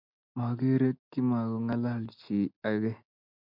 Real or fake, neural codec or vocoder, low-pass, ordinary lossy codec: real; none; 5.4 kHz; MP3, 48 kbps